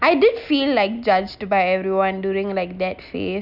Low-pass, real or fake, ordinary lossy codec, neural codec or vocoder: 5.4 kHz; real; none; none